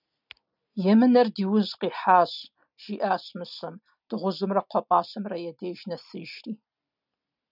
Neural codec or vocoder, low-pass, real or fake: none; 5.4 kHz; real